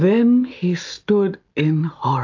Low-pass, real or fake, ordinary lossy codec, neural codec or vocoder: 7.2 kHz; real; AAC, 48 kbps; none